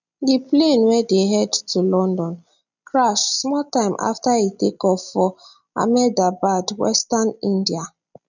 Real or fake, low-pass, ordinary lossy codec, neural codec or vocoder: real; 7.2 kHz; none; none